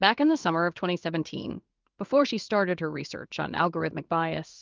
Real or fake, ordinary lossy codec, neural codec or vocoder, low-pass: fake; Opus, 24 kbps; codec, 16 kHz in and 24 kHz out, 1 kbps, XY-Tokenizer; 7.2 kHz